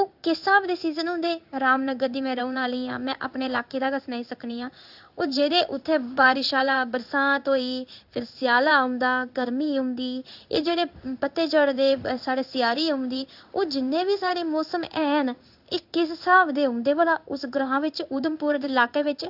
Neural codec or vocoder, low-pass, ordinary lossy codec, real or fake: codec, 16 kHz in and 24 kHz out, 1 kbps, XY-Tokenizer; 5.4 kHz; AAC, 48 kbps; fake